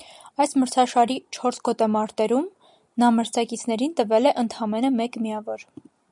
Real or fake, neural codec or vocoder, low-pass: real; none; 10.8 kHz